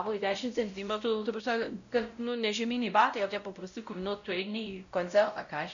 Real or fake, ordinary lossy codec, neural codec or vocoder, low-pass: fake; AAC, 64 kbps; codec, 16 kHz, 0.5 kbps, X-Codec, WavLM features, trained on Multilingual LibriSpeech; 7.2 kHz